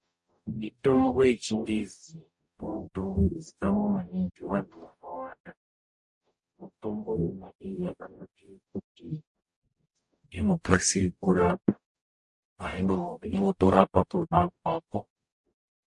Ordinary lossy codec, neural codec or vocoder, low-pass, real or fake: MP3, 48 kbps; codec, 44.1 kHz, 0.9 kbps, DAC; 10.8 kHz; fake